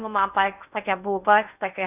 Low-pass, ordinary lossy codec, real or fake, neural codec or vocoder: 3.6 kHz; none; fake; codec, 16 kHz in and 24 kHz out, 0.6 kbps, FocalCodec, streaming, 2048 codes